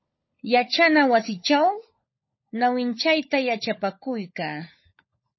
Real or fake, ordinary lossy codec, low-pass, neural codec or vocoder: fake; MP3, 24 kbps; 7.2 kHz; codec, 16 kHz, 16 kbps, FunCodec, trained on LibriTTS, 50 frames a second